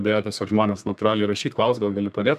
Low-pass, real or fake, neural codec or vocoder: 14.4 kHz; fake; codec, 32 kHz, 1.9 kbps, SNAC